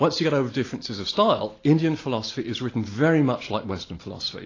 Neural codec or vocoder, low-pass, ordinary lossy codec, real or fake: none; 7.2 kHz; AAC, 32 kbps; real